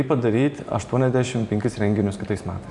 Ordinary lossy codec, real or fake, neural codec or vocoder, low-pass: MP3, 96 kbps; fake; vocoder, 44.1 kHz, 128 mel bands every 512 samples, BigVGAN v2; 10.8 kHz